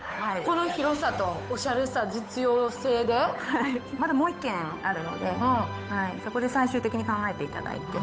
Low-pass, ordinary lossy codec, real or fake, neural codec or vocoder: none; none; fake; codec, 16 kHz, 8 kbps, FunCodec, trained on Chinese and English, 25 frames a second